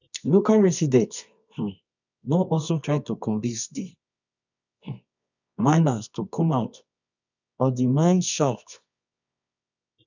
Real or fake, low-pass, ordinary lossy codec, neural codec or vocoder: fake; 7.2 kHz; none; codec, 24 kHz, 0.9 kbps, WavTokenizer, medium music audio release